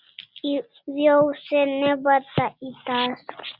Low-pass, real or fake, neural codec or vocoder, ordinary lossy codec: 5.4 kHz; real; none; Opus, 64 kbps